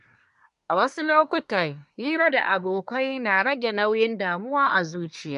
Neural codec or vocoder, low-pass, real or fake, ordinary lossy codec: codec, 24 kHz, 1 kbps, SNAC; 10.8 kHz; fake; MP3, 64 kbps